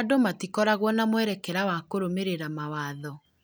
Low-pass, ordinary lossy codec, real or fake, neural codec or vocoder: none; none; real; none